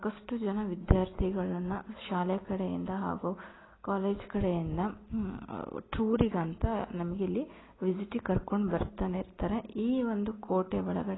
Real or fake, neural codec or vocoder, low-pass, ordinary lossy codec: real; none; 7.2 kHz; AAC, 16 kbps